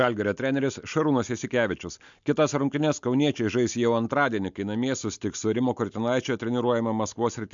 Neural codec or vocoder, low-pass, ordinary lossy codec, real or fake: none; 7.2 kHz; MP3, 64 kbps; real